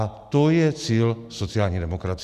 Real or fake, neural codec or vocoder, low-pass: real; none; 14.4 kHz